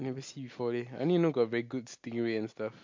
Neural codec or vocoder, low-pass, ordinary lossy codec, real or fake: none; 7.2 kHz; MP3, 48 kbps; real